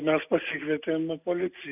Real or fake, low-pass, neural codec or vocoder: real; 3.6 kHz; none